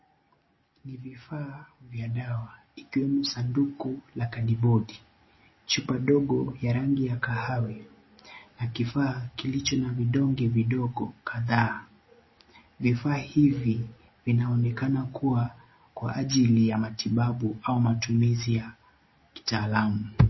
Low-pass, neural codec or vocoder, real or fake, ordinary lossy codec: 7.2 kHz; none; real; MP3, 24 kbps